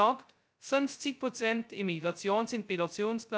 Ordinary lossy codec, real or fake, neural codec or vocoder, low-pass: none; fake; codec, 16 kHz, 0.2 kbps, FocalCodec; none